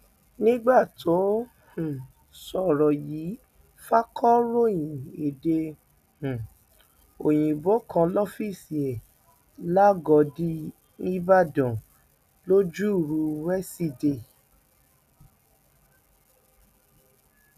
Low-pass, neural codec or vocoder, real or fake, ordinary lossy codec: 14.4 kHz; none; real; none